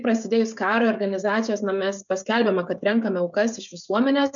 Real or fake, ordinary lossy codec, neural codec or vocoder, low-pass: fake; MP3, 96 kbps; codec, 16 kHz, 16 kbps, FreqCodec, smaller model; 7.2 kHz